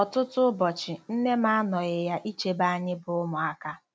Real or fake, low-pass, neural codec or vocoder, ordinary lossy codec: real; none; none; none